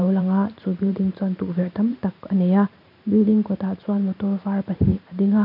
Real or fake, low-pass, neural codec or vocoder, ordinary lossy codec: fake; 5.4 kHz; vocoder, 44.1 kHz, 128 mel bands every 512 samples, BigVGAN v2; none